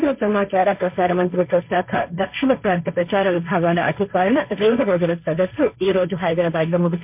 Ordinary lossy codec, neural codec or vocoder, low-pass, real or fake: MP3, 32 kbps; codec, 16 kHz, 1.1 kbps, Voila-Tokenizer; 3.6 kHz; fake